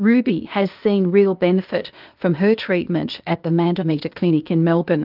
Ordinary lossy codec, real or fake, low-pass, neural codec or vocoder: Opus, 32 kbps; fake; 5.4 kHz; codec, 16 kHz, 0.8 kbps, ZipCodec